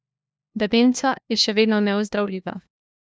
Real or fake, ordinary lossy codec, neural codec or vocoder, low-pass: fake; none; codec, 16 kHz, 1 kbps, FunCodec, trained on LibriTTS, 50 frames a second; none